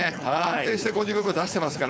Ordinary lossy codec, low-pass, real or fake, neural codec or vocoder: none; none; fake; codec, 16 kHz, 4.8 kbps, FACodec